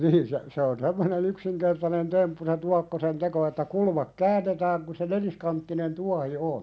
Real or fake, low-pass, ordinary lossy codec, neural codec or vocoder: real; none; none; none